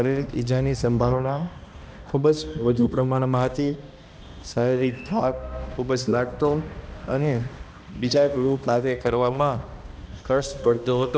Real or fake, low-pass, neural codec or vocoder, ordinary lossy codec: fake; none; codec, 16 kHz, 1 kbps, X-Codec, HuBERT features, trained on balanced general audio; none